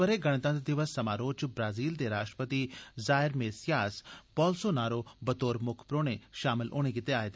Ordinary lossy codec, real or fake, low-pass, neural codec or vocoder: none; real; none; none